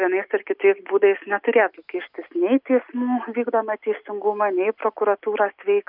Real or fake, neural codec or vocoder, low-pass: real; none; 5.4 kHz